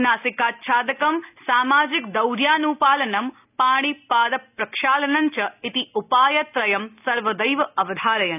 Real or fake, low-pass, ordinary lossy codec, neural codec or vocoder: real; 3.6 kHz; none; none